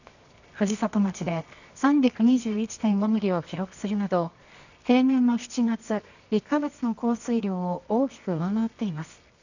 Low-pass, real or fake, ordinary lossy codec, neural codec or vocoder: 7.2 kHz; fake; none; codec, 24 kHz, 0.9 kbps, WavTokenizer, medium music audio release